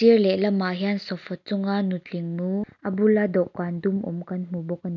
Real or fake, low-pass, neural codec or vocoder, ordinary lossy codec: real; 7.2 kHz; none; none